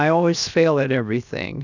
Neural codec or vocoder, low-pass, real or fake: codec, 16 kHz, 0.7 kbps, FocalCodec; 7.2 kHz; fake